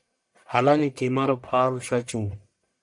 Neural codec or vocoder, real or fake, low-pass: codec, 44.1 kHz, 1.7 kbps, Pupu-Codec; fake; 10.8 kHz